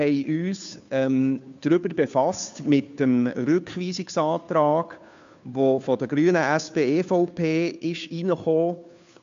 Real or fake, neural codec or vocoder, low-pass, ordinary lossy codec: fake; codec, 16 kHz, 2 kbps, FunCodec, trained on Chinese and English, 25 frames a second; 7.2 kHz; MP3, 64 kbps